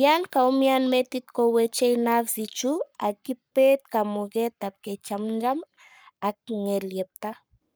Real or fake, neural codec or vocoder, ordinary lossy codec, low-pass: fake; codec, 44.1 kHz, 7.8 kbps, Pupu-Codec; none; none